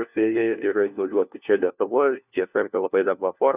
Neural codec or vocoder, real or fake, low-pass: codec, 16 kHz, 1 kbps, FunCodec, trained on LibriTTS, 50 frames a second; fake; 3.6 kHz